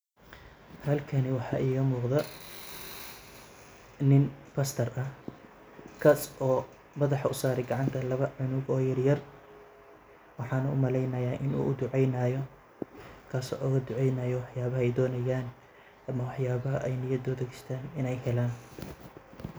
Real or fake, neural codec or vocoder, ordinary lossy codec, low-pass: real; none; none; none